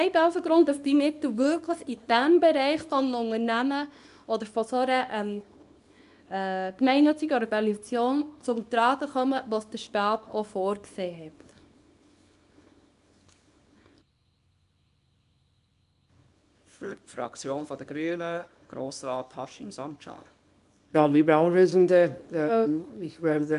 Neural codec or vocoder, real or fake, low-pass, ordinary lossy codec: codec, 24 kHz, 0.9 kbps, WavTokenizer, small release; fake; 10.8 kHz; none